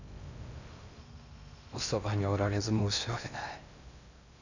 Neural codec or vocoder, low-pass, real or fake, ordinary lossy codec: codec, 16 kHz in and 24 kHz out, 0.6 kbps, FocalCodec, streaming, 2048 codes; 7.2 kHz; fake; none